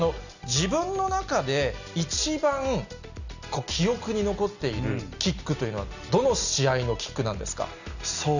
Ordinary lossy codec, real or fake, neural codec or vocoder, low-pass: none; real; none; 7.2 kHz